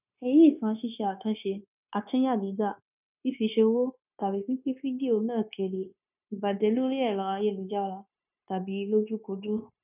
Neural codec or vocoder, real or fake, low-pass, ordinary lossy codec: codec, 16 kHz, 0.9 kbps, LongCat-Audio-Codec; fake; 3.6 kHz; none